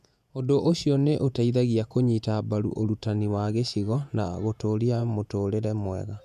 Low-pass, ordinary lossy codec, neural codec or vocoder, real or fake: 14.4 kHz; none; vocoder, 48 kHz, 128 mel bands, Vocos; fake